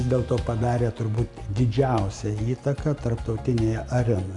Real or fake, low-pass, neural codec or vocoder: real; 10.8 kHz; none